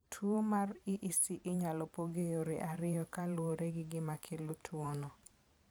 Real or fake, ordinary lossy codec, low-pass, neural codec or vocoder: fake; none; none; vocoder, 44.1 kHz, 128 mel bands every 512 samples, BigVGAN v2